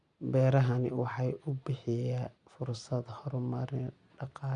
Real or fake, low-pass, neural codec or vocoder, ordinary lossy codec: real; 9.9 kHz; none; Opus, 64 kbps